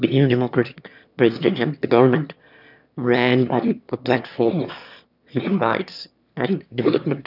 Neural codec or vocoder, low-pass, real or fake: autoencoder, 22.05 kHz, a latent of 192 numbers a frame, VITS, trained on one speaker; 5.4 kHz; fake